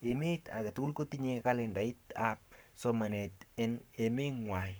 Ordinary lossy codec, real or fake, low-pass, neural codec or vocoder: none; fake; none; codec, 44.1 kHz, 7.8 kbps, Pupu-Codec